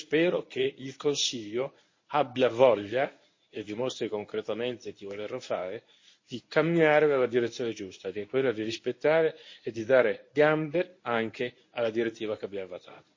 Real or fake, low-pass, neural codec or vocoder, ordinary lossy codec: fake; 7.2 kHz; codec, 24 kHz, 0.9 kbps, WavTokenizer, medium speech release version 1; MP3, 32 kbps